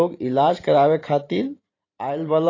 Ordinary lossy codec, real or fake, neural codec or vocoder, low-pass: AAC, 32 kbps; fake; vocoder, 44.1 kHz, 128 mel bands every 512 samples, BigVGAN v2; 7.2 kHz